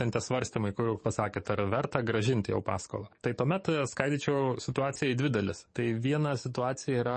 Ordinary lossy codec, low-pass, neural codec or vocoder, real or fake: MP3, 32 kbps; 10.8 kHz; codec, 44.1 kHz, 7.8 kbps, DAC; fake